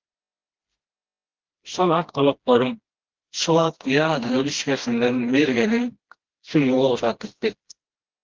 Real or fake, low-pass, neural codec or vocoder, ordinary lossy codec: fake; 7.2 kHz; codec, 16 kHz, 1 kbps, FreqCodec, smaller model; Opus, 16 kbps